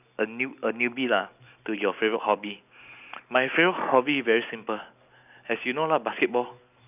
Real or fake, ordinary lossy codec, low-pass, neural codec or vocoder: real; none; 3.6 kHz; none